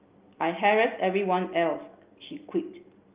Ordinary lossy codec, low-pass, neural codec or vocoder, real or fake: Opus, 24 kbps; 3.6 kHz; none; real